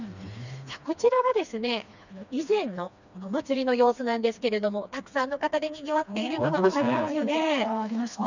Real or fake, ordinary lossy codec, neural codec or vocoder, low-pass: fake; none; codec, 16 kHz, 2 kbps, FreqCodec, smaller model; 7.2 kHz